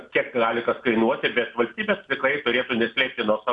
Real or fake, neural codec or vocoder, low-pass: real; none; 10.8 kHz